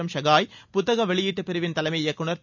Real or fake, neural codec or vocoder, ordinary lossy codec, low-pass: real; none; none; 7.2 kHz